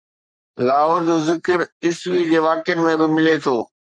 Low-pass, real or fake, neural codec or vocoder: 9.9 kHz; fake; codec, 44.1 kHz, 3.4 kbps, Pupu-Codec